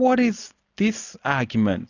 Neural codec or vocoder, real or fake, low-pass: none; real; 7.2 kHz